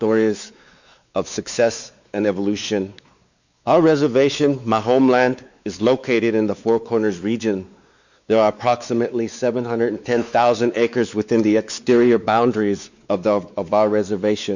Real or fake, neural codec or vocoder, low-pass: fake; codec, 16 kHz, 4 kbps, X-Codec, WavLM features, trained on Multilingual LibriSpeech; 7.2 kHz